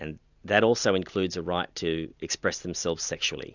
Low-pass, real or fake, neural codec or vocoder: 7.2 kHz; real; none